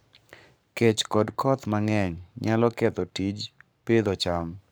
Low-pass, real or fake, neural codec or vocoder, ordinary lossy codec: none; fake; codec, 44.1 kHz, 7.8 kbps, Pupu-Codec; none